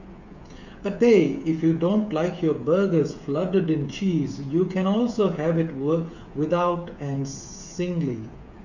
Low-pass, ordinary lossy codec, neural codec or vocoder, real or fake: 7.2 kHz; none; codec, 16 kHz, 8 kbps, FreqCodec, smaller model; fake